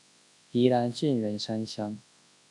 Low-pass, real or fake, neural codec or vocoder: 10.8 kHz; fake; codec, 24 kHz, 0.9 kbps, WavTokenizer, large speech release